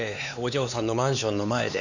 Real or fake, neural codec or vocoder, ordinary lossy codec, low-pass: fake; codec, 16 kHz, 4 kbps, X-Codec, HuBERT features, trained on LibriSpeech; none; 7.2 kHz